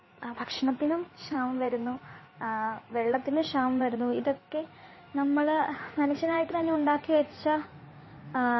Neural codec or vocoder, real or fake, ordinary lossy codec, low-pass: codec, 16 kHz in and 24 kHz out, 2.2 kbps, FireRedTTS-2 codec; fake; MP3, 24 kbps; 7.2 kHz